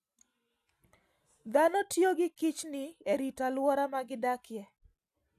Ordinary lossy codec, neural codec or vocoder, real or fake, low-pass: none; none; real; 14.4 kHz